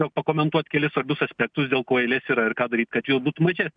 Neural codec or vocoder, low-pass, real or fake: none; 9.9 kHz; real